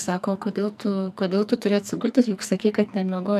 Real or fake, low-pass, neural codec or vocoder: fake; 14.4 kHz; codec, 44.1 kHz, 2.6 kbps, SNAC